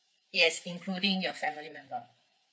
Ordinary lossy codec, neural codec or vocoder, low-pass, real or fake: none; codec, 16 kHz, 4 kbps, FreqCodec, larger model; none; fake